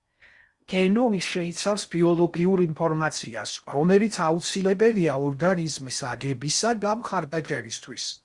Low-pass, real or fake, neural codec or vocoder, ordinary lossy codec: 10.8 kHz; fake; codec, 16 kHz in and 24 kHz out, 0.6 kbps, FocalCodec, streaming, 4096 codes; Opus, 64 kbps